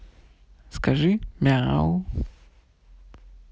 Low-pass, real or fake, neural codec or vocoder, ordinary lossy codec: none; real; none; none